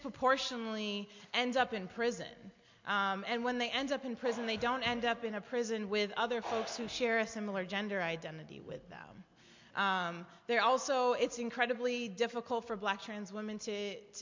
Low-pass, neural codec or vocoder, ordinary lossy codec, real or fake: 7.2 kHz; none; MP3, 48 kbps; real